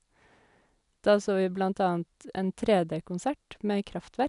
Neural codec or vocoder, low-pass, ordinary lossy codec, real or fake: none; 10.8 kHz; none; real